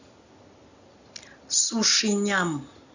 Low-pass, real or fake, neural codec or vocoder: 7.2 kHz; real; none